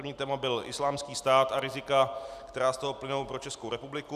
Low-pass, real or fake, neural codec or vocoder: 14.4 kHz; real; none